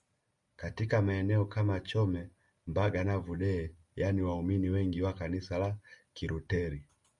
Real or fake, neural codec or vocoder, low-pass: real; none; 10.8 kHz